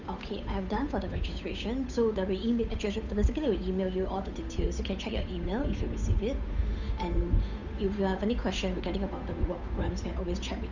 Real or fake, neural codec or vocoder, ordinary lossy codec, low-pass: fake; codec, 16 kHz, 8 kbps, FunCodec, trained on Chinese and English, 25 frames a second; none; 7.2 kHz